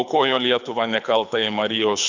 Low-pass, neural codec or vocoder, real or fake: 7.2 kHz; codec, 24 kHz, 6 kbps, HILCodec; fake